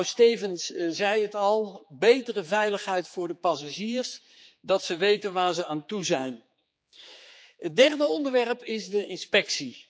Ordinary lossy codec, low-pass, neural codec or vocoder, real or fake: none; none; codec, 16 kHz, 4 kbps, X-Codec, HuBERT features, trained on general audio; fake